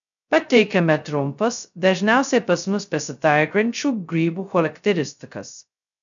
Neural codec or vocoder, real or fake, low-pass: codec, 16 kHz, 0.2 kbps, FocalCodec; fake; 7.2 kHz